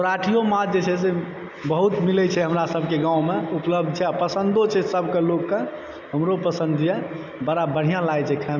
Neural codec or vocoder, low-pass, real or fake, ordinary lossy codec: none; 7.2 kHz; real; none